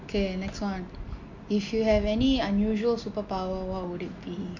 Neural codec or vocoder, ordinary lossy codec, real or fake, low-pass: none; MP3, 48 kbps; real; 7.2 kHz